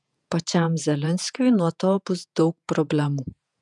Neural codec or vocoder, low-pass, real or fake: vocoder, 48 kHz, 128 mel bands, Vocos; 10.8 kHz; fake